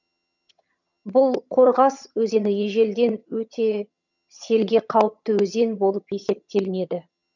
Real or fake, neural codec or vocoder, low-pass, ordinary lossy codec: fake; vocoder, 22.05 kHz, 80 mel bands, HiFi-GAN; 7.2 kHz; none